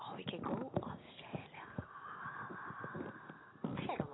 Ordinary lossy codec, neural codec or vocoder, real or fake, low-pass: AAC, 16 kbps; none; real; 7.2 kHz